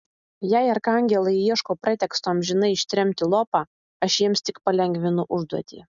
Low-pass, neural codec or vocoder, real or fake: 7.2 kHz; none; real